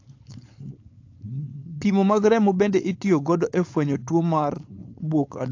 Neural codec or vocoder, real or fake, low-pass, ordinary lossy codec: codec, 16 kHz, 4.8 kbps, FACodec; fake; 7.2 kHz; AAC, 48 kbps